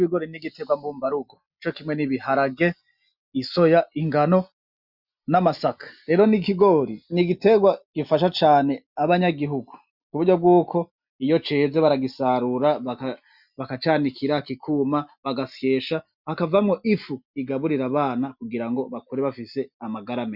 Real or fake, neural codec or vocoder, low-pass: real; none; 5.4 kHz